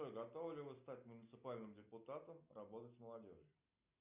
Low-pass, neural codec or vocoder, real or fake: 3.6 kHz; none; real